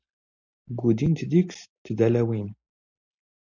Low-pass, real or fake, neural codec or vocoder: 7.2 kHz; real; none